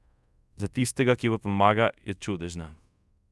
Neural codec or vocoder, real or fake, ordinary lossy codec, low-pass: codec, 24 kHz, 0.5 kbps, DualCodec; fake; none; none